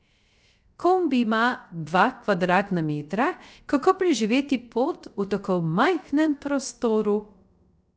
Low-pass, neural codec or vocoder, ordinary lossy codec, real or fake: none; codec, 16 kHz, 0.3 kbps, FocalCodec; none; fake